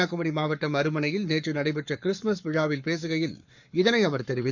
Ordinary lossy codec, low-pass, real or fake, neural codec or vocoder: none; 7.2 kHz; fake; codec, 44.1 kHz, 7.8 kbps, Pupu-Codec